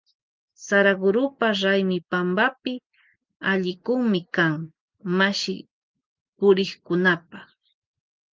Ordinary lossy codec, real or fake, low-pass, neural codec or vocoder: Opus, 32 kbps; real; 7.2 kHz; none